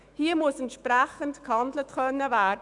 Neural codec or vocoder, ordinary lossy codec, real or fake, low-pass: autoencoder, 48 kHz, 128 numbers a frame, DAC-VAE, trained on Japanese speech; none; fake; 10.8 kHz